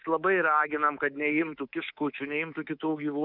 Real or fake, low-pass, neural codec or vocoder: fake; 5.4 kHz; codec, 24 kHz, 3.1 kbps, DualCodec